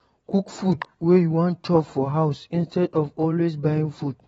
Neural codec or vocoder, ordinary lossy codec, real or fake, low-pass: vocoder, 22.05 kHz, 80 mel bands, WaveNeXt; AAC, 24 kbps; fake; 9.9 kHz